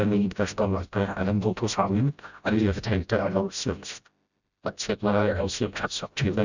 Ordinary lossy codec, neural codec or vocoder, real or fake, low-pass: none; codec, 16 kHz, 0.5 kbps, FreqCodec, smaller model; fake; 7.2 kHz